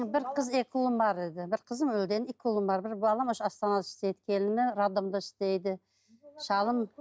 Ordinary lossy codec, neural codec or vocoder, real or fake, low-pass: none; none; real; none